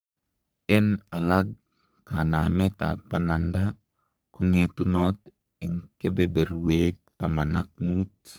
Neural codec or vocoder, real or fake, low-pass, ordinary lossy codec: codec, 44.1 kHz, 3.4 kbps, Pupu-Codec; fake; none; none